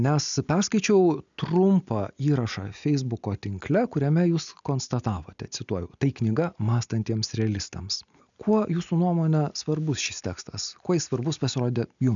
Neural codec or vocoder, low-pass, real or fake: none; 7.2 kHz; real